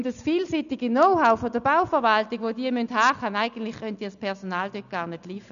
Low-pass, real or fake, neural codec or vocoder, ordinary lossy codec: 7.2 kHz; real; none; none